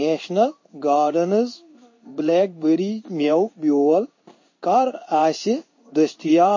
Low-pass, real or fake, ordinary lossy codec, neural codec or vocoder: 7.2 kHz; fake; MP3, 32 kbps; codec, 16 kHz in and 24 kHz out, 1 kbps, XY-Tokenizer